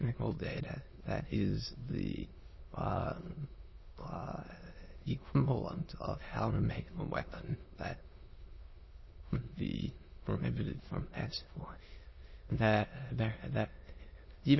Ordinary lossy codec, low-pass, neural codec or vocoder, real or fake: MP3, 24 kbps; 7.2 kHz; autoencoder, 22.05 kHz, a latent of 192 numbers a frame, VITS, trained on many speakers; fake